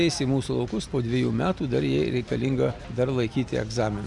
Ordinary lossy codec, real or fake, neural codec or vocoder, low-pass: Opus, 64 kbps; real; none; 10.8 kHz